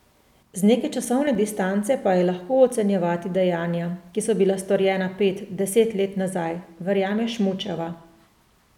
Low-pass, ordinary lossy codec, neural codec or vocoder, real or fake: 19.8 kHz; none; none; real